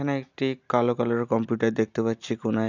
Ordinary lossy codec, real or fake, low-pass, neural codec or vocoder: none; real; 7.2 kHz; none